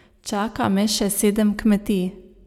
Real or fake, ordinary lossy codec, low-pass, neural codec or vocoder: real; none; 19.8 kHz; none